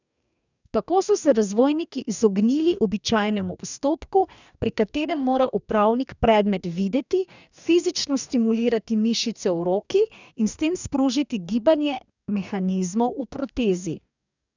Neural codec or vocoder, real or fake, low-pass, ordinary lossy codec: codec, 44.1 kHz, 2.6 kbps, DAC; fake; 7.2 kHz; none